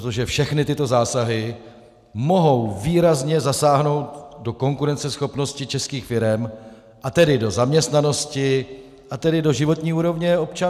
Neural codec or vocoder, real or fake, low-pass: none; real; 14.4 kHz